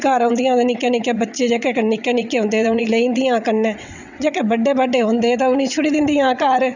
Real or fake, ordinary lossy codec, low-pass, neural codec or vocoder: fake; none; 7.2 kHz; vocoder, 22.05 kHz, 80 mel bands, WaveNeXt